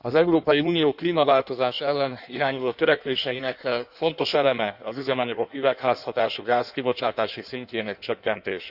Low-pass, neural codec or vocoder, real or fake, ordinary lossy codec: 5.4 kHz; codec, 16 kHz in and 24 kHz out, 1.1 kbps, FireRedTTS-2 codec; fake; none